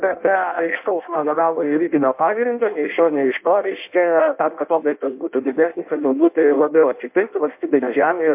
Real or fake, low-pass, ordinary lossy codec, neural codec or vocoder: fake; 3.6 kHz; MP3, 32 kbps; codec, 16 kHz in and 24 kHz out, 0.6 kbps, FireRedTTS-2 codec